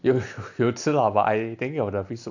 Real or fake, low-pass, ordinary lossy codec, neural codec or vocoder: real; 7.2 kHz; none; none